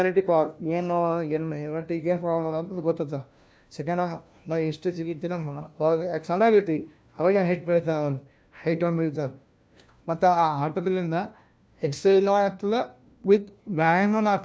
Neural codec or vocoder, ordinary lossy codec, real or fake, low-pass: codec, 16 kHz, 1 kbps, FunCodec, trained on LibriTTS, 50 frames a second; none; fake; none